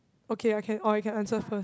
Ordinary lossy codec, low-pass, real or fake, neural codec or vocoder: none; none; real; none